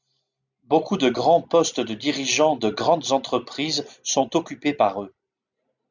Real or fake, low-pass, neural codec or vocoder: real; 7.2 kHz; none